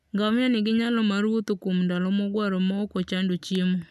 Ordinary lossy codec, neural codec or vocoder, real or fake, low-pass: none; none; real; 14.4 kHz